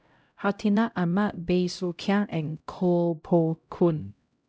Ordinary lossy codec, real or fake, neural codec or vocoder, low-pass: none; fake; codec, 16 kHz, 0.5 kbps, X-Codec, HuBERT features, trained on LibriSpeech; none